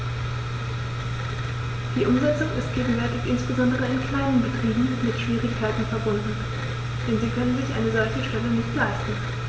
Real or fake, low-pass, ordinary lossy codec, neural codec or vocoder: real; none; none; none